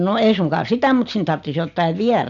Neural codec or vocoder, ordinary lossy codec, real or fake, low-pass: none; none; real; 7.2 kHz